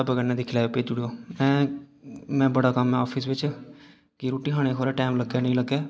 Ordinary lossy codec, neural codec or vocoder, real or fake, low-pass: none; none; real; none